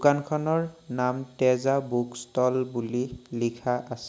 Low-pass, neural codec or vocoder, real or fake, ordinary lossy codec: none; none; real; none